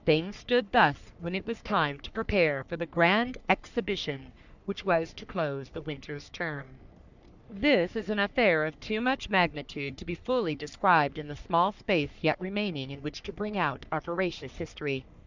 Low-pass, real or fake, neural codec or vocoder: 7.2 kHz; fake; codec, 44.1 kHz, 3.4 kbps, Pupu-Codec